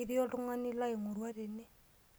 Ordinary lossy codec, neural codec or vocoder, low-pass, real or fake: none; none; none; real